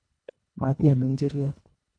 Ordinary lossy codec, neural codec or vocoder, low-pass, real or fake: none; codec, 24 kHz, 1.5 kbps, HILCodec; 9.9 kHz; fake